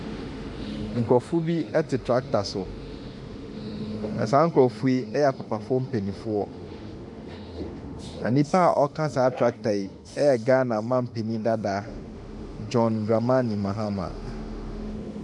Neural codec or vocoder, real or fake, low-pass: autoencoder, 48 kHz, 32 numbers a frame, DAC-VAE, trained on Japanese speech; fake; 10.8 kHz